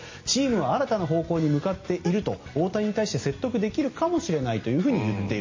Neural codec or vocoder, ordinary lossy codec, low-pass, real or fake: none; MP3, 32 kbps; 7.2 kHz; real